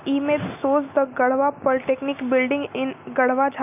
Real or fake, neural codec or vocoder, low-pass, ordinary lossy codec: real; none; 3.6 kHz; none